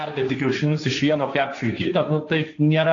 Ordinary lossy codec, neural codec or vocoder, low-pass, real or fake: AAC, 48 kbps; codec, 16 kHz, 1.1 kbps, Voila-Tokenizer; 7.2 kHz; fake